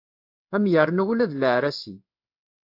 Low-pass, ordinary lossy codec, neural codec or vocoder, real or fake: 5.4 kHz; AAC, 48 kbps; codec, 16 kHz in and 24 kHz out, 1 kbps, XY-Tokenizer; fake